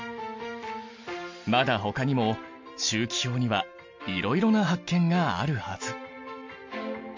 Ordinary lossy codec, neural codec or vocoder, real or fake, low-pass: MP3, 48 kbps; none; real; 7.2 kHz